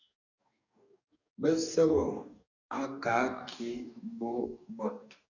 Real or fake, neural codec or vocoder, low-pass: fake; codec, 44.1 kHz, 2.6 kbps, DAC; 7.2 kHz